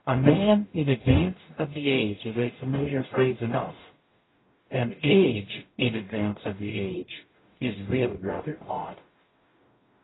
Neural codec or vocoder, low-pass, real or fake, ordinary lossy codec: codec, 44.1 kHz, 0.9 kbps, DAC; 7.2 kHz; fake; AAC, 16 kbps